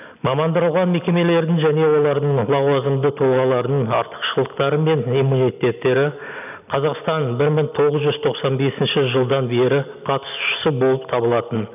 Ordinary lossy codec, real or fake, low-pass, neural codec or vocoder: none; real; 3.6 kHz; none